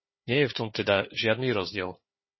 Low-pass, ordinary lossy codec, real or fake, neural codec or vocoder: 7.2 kHz; MP3, 24 kbps; fake; codec, 16 kHz, 4 kbps, FunCodec, trained on Chinese and English, 50 frames a second